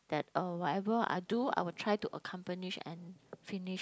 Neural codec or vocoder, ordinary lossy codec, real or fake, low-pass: none; none; real; none